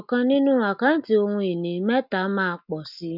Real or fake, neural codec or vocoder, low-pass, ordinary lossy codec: real; none; 5.4 kHz; none